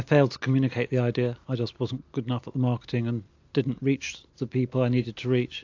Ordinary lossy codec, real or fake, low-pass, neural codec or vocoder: AAC, 48 kbps; real; 7.2 kHz; none